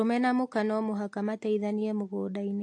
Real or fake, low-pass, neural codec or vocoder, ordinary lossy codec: real; 10.8 kHz; none; AAC, 48 kbps